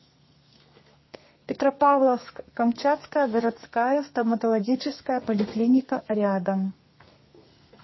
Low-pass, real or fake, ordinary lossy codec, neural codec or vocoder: 7.2 kHz; fake; MP3, 24 kbps; codec, 32 kHz, 1.9 kbps, SNAC